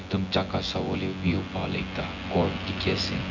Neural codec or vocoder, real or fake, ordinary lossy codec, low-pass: vocoder, 24 kHz, 100 mel bands, Vocos; fake; MP3, 64 kbps; 7.2 kHz